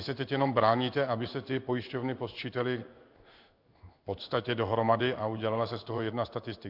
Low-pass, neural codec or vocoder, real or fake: 5.4 kHz; codec, 16 kHz in and 24 kHz out, 1 kbps, XY-Tokenizer; fake